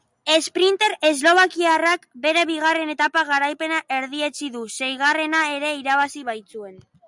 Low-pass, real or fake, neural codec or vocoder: 10.8 kHz; real; none